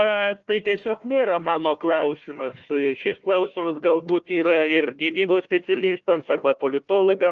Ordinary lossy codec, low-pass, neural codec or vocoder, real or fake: Opus, 24 kbps; 7.2 kHz; codec, 16 kHz, 1 kbps, FunCodec, trained on Chinese and English, 50 frames a second; fake